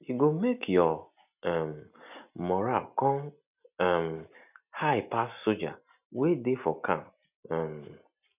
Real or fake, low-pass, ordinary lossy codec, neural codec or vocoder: real; 3.6 kHz; none; none